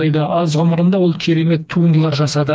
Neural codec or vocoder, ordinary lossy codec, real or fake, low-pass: codec, 16 kHz, 2 kbps, FreqCodec, smaller model; none; fake; none